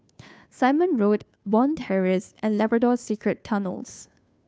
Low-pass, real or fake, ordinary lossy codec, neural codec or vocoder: none; fake; none; codec, 16 kHz, 2 kbps, FunCodec, trained on Chinese and English, 25 frames a second